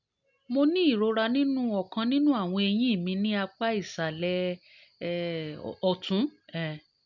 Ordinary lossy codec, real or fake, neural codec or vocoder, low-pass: none; real; none; 7.2 kHz